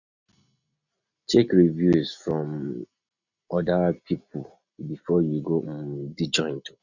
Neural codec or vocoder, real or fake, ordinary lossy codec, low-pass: none; real; none; 7.2 kHz